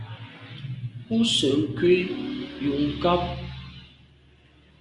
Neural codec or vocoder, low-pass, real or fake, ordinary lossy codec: none; 10.8 kHz; real; Opus, 64 kbps